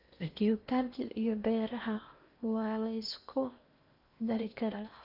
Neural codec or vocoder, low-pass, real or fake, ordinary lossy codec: codec, 16 kHz in and 24 kHz out, 0.8 kbps, FocalCodec, streaming, 65536 codes; 5.4 kHz; fake; none